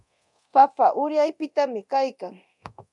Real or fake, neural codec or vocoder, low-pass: fake; codec, 24 kHz, 0.9 kbps, DualCodec; 10.8 kHz